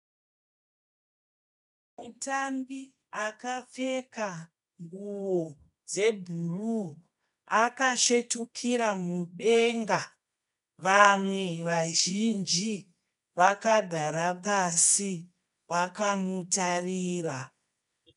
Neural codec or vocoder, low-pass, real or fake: codec, 24 kHz, 0.9 kbps, WavTokenizer, medium music audio release; 10.8 kHz; fake